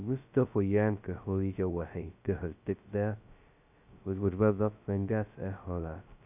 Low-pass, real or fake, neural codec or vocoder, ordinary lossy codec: 3.6 kHz; fake; codec, 16 kHz, 0.2 kbps, FocalCodec; none